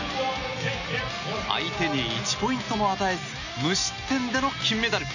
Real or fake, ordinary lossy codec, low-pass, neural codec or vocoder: real; none; 7.2 kHz; none